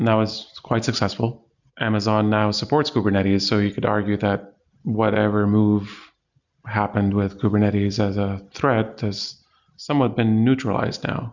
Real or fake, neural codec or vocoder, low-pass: real; none; 7.2 kHz